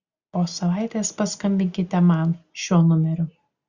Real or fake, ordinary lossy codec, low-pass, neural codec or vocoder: real; Opus, 64 kbps; 7.2 kHz; none